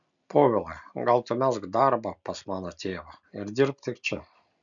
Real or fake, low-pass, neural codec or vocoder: real; 7.2 kHz; none